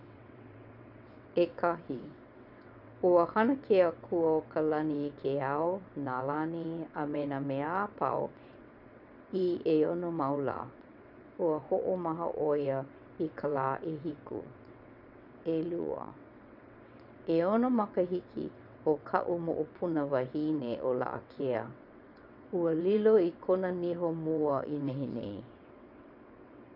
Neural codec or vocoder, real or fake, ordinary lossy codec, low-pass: vocoder, 22.05 kHz, 80 mel bands, WaveNeXt; fake; none; 5.4 kHz